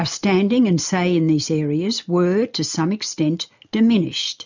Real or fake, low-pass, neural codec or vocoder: real; 7.2 kHz; none